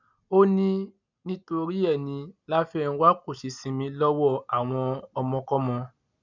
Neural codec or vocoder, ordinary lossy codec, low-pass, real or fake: none; none; 7.2 kHz; real